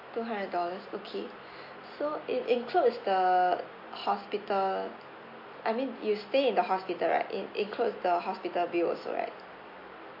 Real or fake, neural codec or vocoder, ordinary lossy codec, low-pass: real; none; MP3, 32 kbps; 5.4 kHz